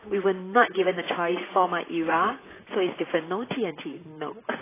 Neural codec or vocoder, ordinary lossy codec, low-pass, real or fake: vocoder, 44.1 kHz, 128 mel bands, Pupu-Vocoder; AAC, 16 kbps; 3.6 kHz; fake